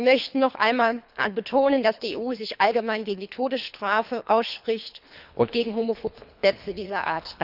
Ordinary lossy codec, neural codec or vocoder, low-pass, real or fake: none; codec, 24 kHz, 3 kbps, HILCodec; 5.4 kHz; fake